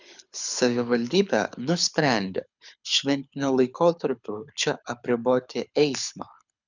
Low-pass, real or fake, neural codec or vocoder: 7.2 kHz; fake; codec, 24 kHz, 6 kbps, HILCodec